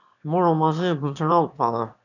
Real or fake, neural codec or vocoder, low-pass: fake; autoencoder, 22.05 kHz, a latent of 192 numbers a frame, VITS, trained on one speaker; 7.2 kHz